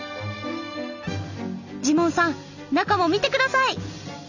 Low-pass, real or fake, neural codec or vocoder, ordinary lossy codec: 7.2 kHz; real; none; none